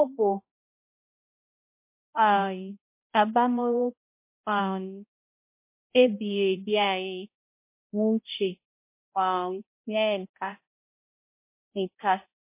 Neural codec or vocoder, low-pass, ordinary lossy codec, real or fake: codec, 16 kHz, 0.5 kbps, X-Codec, HuBERT features, trained on balanced general audio; 3.6 kHz; MP3, 24 kbps; fake